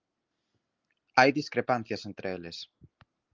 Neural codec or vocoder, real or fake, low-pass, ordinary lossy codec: none; real; 7.2 kHz; Opus, 32 kbps